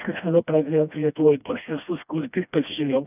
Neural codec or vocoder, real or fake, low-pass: codec, 16 kHz, 1 kbps, FreqCodec, smaller model; fake; 3.6 kHz